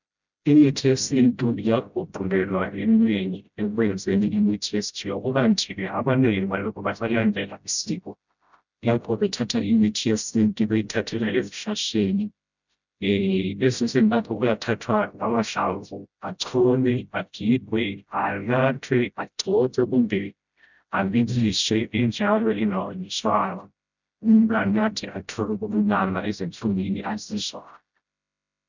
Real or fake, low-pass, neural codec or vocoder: fake; 7.2 kHz; codec, 16 kHz, 0.5 kbps, FreqCodec, smaller model